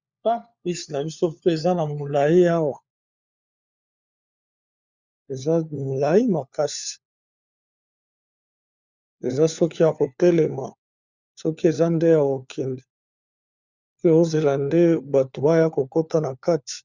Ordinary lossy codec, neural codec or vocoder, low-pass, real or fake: Opus, 64 kbps; codec, 16 kHz, 4 kbps, FunCodec, trained on LibriTTS, 50 frames a second; 7.2 kHz; fake